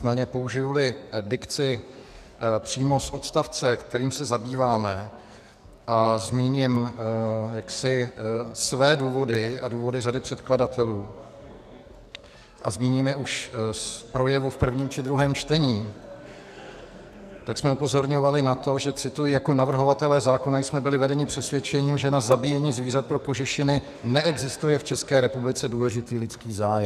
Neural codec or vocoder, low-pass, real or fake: codec, 44.1 kHz, 2.6 kbps, SNAC; 14.4 kHz; fake